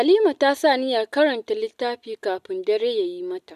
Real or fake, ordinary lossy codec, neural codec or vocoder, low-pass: real; none; none; 14.4 kHz